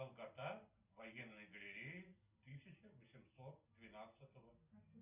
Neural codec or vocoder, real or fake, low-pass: none; real; 3.6 kHz